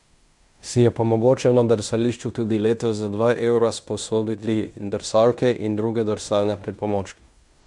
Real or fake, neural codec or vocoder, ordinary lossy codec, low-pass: fake; codec, 16 kHz in and 24 kHz out, 0.9 kbps, LongCat-Audio-Codec, fine tuned four codebook decoder; none; 10.8 kHz